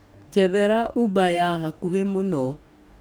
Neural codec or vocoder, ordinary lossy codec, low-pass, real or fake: codec, 44.1 kHz, 2.6 kbps, DAC; none; none; fake